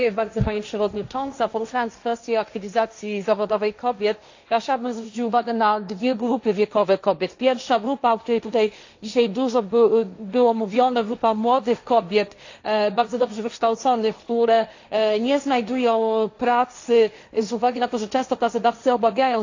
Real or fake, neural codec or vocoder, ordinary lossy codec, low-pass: fake; codec, 16 kHz, 1.1 kbps, Voila-Tokenizer; AAC, 48 kbps; 7.2 kHz